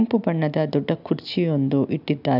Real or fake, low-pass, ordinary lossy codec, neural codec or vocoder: real; 5.4 kHz; none; none